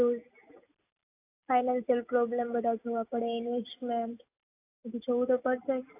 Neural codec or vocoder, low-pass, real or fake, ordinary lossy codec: none; 3.6 kHz; real; AAC, 24 kbps